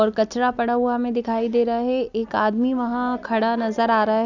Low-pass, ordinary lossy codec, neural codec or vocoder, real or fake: 7.2 kHz; none; none; real